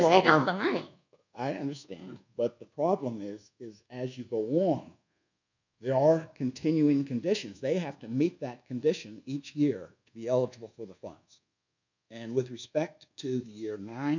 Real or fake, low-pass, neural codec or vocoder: fake; 7.2 kHz; codec, 24 kHz, 1.2 kbps, DualCodec